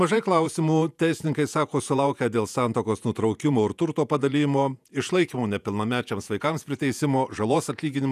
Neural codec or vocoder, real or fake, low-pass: vocoder, 48 kHz, 128 mel bands, Vocos; fake; 14.4 kHz